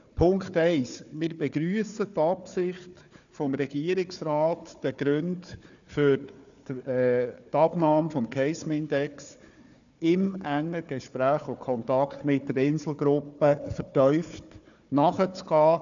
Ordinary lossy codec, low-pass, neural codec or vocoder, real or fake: none; 7.2 kHz; codec, 16 kHz, 4 kbps, FunCodec, trained on Chinese and English, 50 frames a second; fake